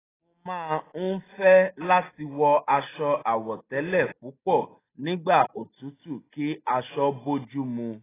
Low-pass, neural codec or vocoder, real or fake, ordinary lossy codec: 3.6 kHz; none; real; AAC, 16 kbps